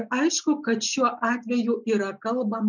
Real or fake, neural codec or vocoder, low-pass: real; none; 7.2 kHz